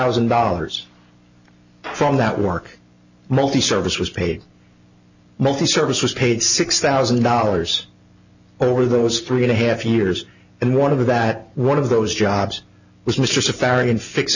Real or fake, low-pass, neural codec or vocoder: real; 7.2 kHz; none